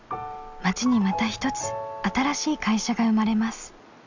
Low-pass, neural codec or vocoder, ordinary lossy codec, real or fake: 7.2 kHz; none; none; real